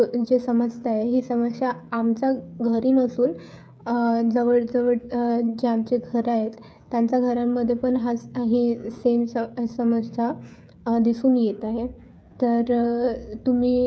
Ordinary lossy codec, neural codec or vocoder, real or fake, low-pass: none; codec, 16 kHz, 16 kbps, FreqCodec, smaller model; fake; none